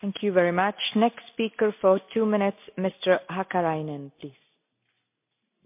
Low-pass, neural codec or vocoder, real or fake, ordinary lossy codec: 3.6 kHz; none; real; MP3, 32 kbps